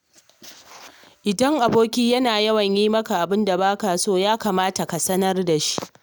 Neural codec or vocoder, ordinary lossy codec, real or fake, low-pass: none; none; real; none